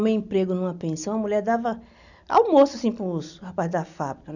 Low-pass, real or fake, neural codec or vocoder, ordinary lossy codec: 7.2 kHz; real; none; none